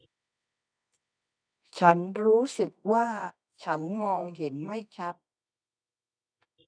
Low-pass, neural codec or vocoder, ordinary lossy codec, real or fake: 9.9 kHz; codec, 24 kHz, 0.9 kbps, WavTokenizer, medium music audio release; none; fake